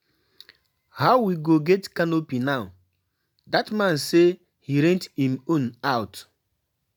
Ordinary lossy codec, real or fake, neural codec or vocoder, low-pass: none; real; none; none